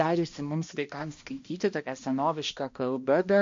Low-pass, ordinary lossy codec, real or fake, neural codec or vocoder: 7.2 kHz; MP3, 48 kbps; fake; codec, 16 kHz, 1 kbps, X-Codec, HuBERT features, trained on balanced general audio